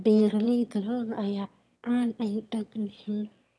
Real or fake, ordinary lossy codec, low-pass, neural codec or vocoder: fake; none; none; autoencoder, 22.05 kHz, a latent of 192 numbers a frame, VITS, trained on one speaker